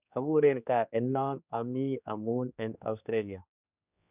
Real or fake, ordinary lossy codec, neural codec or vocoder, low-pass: fake; none; codec, 16 kHz, 2 kbps, X-Codec, HuBERT features, trained on general audio; 3.6 kHz